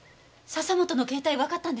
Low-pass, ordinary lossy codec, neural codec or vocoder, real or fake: none; none; none; real